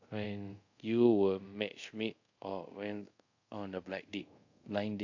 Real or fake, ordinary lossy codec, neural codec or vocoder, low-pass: fake; AAC, 48 kbps; codec, 24 kHz, 0.5 kbps, DualCodec; 7.2 kHz